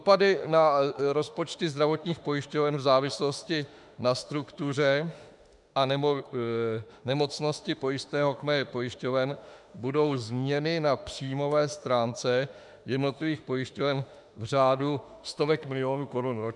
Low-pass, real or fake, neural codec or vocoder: 10.8 kHz; fake; autoencoder, 48 kHz, 32 numbers a frame, DAC-VAE, trained on Japanese speech